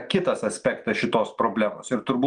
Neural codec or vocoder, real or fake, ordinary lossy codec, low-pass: none; real; Opus, 32 kbps; 9.9 kHz